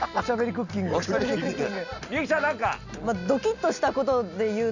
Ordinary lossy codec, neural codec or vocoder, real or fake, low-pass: none; none; real; 7.2 kHz